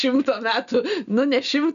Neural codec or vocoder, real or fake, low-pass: none; real; 7.2 kHz